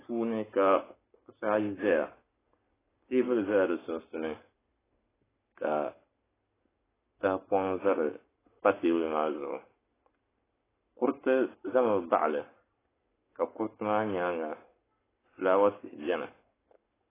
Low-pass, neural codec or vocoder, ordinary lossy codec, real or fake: 3.6 kHz; codec, 44.1 kHz, 7.8 kbps, Pupu-Codec; AAC, 16 kbps; fake